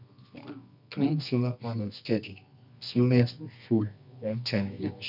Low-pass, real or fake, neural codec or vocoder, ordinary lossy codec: 5.4 kHz; fake; codec, 24 kHz, 0.9 kbps, WavTokenizer, medium music audio release; none